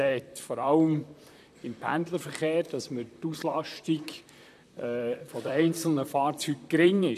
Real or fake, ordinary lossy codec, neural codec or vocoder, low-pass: fake; none; vocoder, 44.1 kHz, 128 mel bands, Pupu-Vocoder; 14.4 kHz